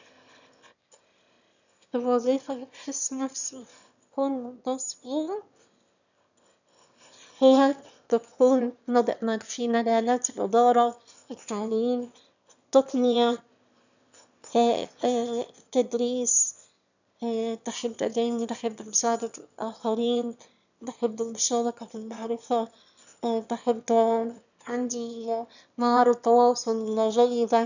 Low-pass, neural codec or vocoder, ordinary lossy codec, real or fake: 7.2 kHz; autoencoder, 22.05 kHz, a latent of 192 numbers a frame, VITS, trained on one speaker; none; fake